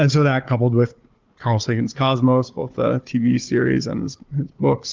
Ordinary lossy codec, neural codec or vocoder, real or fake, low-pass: Opus, 32 kbps; codec, 16 kHz, 4 kbps, FunCodec, trained on Chinese and English, 50 frames a second; fake; 7.2 kHz